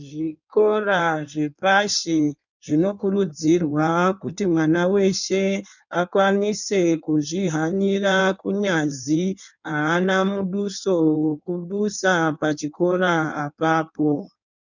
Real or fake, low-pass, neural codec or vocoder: fake; 7.2 kHz; codec, 16 kHz in and 24 kHz out, 1.1 kbps, FireRedTTS-2 codec